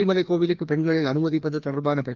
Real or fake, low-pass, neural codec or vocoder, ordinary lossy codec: fake; 7.2 kHz; codec, 16 kHz, 1 kbps, FreqCodec, larger model; Opus, 32 kbps